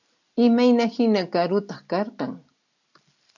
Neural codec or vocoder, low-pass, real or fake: none; 7.2 kHz; real